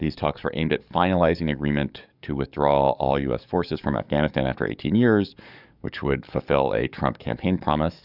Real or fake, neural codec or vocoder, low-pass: fake; codec, 44.1 kHz, 7.8 kbps, DAC; 5.4 kHz